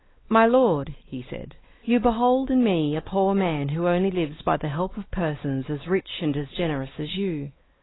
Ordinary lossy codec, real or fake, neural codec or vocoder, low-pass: AAC, 16 kbps; fake; codec, 16 kHz, 4 kbps, X-Codec, WavLM features, trained on Multilingual LibriSpeech; 7.2 kHz